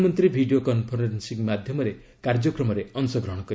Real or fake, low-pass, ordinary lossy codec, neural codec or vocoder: real; none; none; none